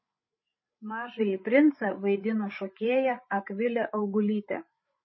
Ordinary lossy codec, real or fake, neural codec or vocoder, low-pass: MP3, 24 kbps; fake; codec, 16 kHz, 8 kbps, FreqCodec, larger model; 7.2 kHz